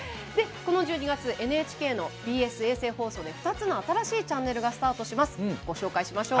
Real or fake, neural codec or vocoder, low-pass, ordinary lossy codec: real; none; none; none